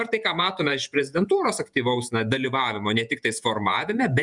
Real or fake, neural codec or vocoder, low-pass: fake; vocoder, 48 kHz, 128 mel bands, Vocos; 10.8 kHz